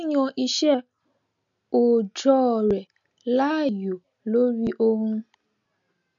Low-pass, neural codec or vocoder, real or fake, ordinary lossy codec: 7.2 kHz; none; real; none